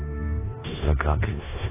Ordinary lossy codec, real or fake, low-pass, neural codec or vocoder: AAC, 16 kbps; fake; 3.6 kHz; codec, 16 kHz in and 24 kHz out, 0.4 kbps, LongCat-Audio-Codec, four codebook decoder